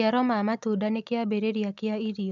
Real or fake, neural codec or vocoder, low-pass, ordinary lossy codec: real; none; 7.2 kHz; none